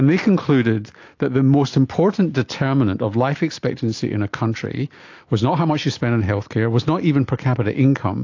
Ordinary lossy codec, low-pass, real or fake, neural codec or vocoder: AAC, 48 kbps; 7.2 kHz; real; none